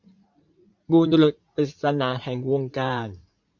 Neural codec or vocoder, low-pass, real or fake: vocoder, 44.1 kHz, 80 mel bands, Vocos; 7.2 kHz; fake